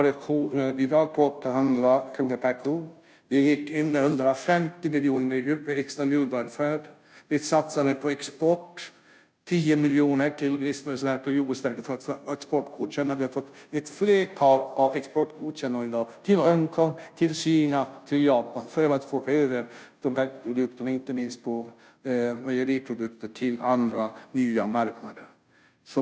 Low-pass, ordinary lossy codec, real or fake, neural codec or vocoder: none; none; fake; codec, 16 kHz, 0.5 kbps, FunCodec, trained on Chinese and English, 25 frames a second